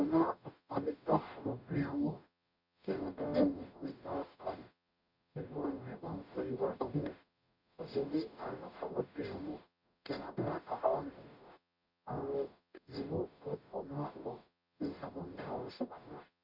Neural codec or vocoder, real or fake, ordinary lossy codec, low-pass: codec, 44.1 kHz, 0.9 kbps, DAC; fake; none; 5.4 kHz